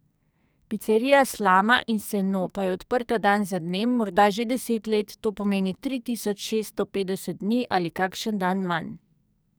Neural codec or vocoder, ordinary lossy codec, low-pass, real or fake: codec, 44.1 kHz, 2.6 kbps, SNAC; none; none; fake